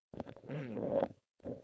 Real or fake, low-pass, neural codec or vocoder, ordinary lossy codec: fake; none; codec, 16 kHz, 4.8 kbps, FACodec; none